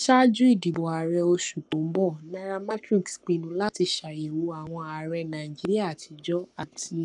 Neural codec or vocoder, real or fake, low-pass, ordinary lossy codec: codec, 44.1 kHz, 7.8 kbps, Pupu-Codec; fake; 10.8 kHz; none